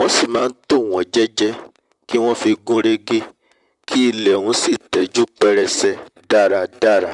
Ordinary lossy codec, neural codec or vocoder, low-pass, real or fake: none; vocoder, 44.1 kHz, 128 mel bands, Pupu-Vocoder; 10.8 kHz; fake